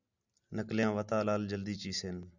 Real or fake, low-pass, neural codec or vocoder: real; 7.2 kHz; none